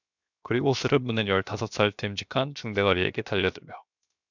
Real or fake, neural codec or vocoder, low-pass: fake; codec, 16 kHz, 0.7 kbps, FocalCodec; 7.2 kHz